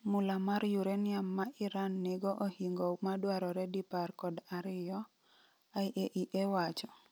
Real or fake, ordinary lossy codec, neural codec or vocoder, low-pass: real; none; none; none